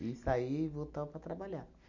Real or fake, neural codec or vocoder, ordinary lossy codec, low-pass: real; none; none; 7.2 kHz